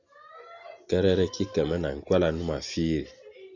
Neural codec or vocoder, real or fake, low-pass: none; real; 7.2 kHz